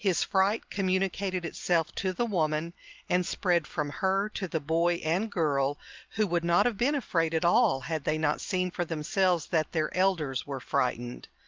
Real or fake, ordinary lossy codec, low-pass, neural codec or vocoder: real; Opus, 24 kbps; 7.2 kHz; none